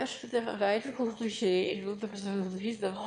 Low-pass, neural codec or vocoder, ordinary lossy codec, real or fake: 9.9 kHz; autoencoder, 22.05 kHz, a latent of 192 numbers a frame, VITS, trained on one speaker; MP3, 64 kbps; fake